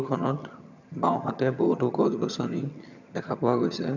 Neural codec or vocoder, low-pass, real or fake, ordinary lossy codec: vocoder, 22.05 kHz, 80 mel bands, HiFi-GAN; 7.2 kHz; fake; none